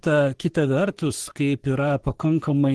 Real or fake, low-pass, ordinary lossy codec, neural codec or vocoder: fake; 10.8 kHz; Opus, 16 kbps; codec, 44.1 kHz, 3.4 kbps, Pupu-Codec